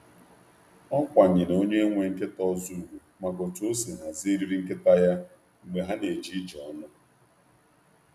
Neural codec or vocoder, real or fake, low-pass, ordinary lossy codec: none; real; 14.4 kHz; none